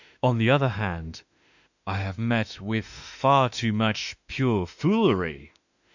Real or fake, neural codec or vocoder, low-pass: fake; autoencoder, 48 kHz, 32 numbers a frame, DAC-VAE, trained on Japanese speech; 7.2 kHz